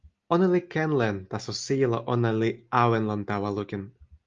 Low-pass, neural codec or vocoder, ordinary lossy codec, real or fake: 7.2 kHz; none; Opus, 32 kbps; real